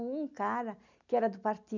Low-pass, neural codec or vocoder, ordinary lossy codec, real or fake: 7.2 kHz; none; none; real